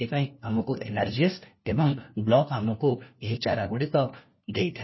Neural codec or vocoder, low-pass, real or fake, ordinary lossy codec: codec, 16 kHz, 1 kbps, FunCodec, trained on LibriTTS, 50 frames a second; 7.2 kHz; fake; MP3, 24 kbps